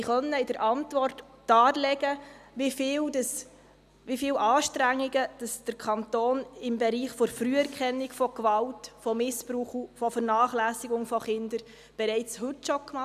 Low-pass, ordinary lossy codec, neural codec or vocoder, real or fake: 14.4 kHz; none; none; real